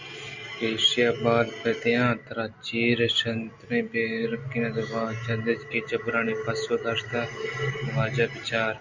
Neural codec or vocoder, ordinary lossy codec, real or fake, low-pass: none; Opus, 64 kbps; real; 7.2 kHz